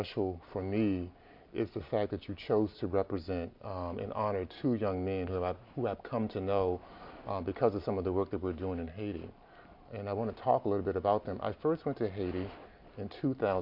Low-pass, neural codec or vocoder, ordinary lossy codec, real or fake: 5.4 kHz; none; MP3, 48 kbps; real